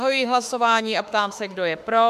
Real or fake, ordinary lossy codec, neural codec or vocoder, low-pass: fake; MP3, 96 kbps; autoencoder, 48 kHz, 32 numbers a frame, DAC-VAE, trained on Japanese speech; 14.4 kHz